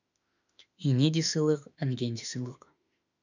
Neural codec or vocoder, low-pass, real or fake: autoencoder, 48 kHz, 32 numbers a frame, DAC-VAE, trained on Japanese speech; 7.2 kHz; fake